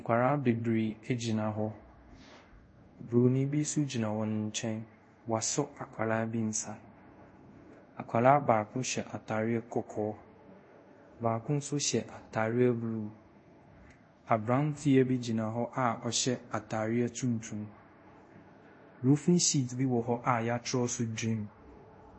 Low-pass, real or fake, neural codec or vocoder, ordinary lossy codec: 9.9 kHz; fake; codec, 24 kHz, 0.5 kbps, DualCodec; MP3, 32 kbps